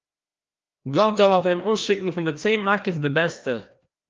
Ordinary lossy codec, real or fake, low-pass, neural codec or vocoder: Opus, 24 kbps; fake; 7.2 kHz; codec, 16 kHz, 1 kbps, FreqCodec, larger model